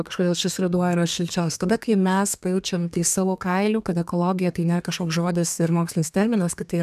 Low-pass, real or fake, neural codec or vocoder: 14.4 kHz; fake; codec, 32 kHz, 1.9 kbps, SNAC